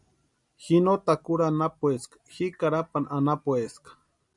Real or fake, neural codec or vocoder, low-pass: real; none; 10.8 kHz